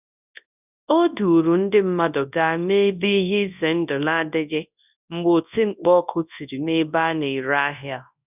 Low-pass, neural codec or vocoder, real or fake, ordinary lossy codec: 3.6 kHz; codec, 24 kHz, 0.9 kbps, WavTokenizer, large speech release; fake; none